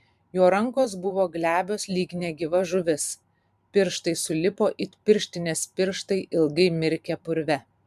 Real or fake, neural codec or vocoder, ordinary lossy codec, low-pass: fake; vocoder, 44.1 kHz, 128 mel bands every 512 samples, BigVGAN v2; MP3, 96 kbps; 14.4 kHz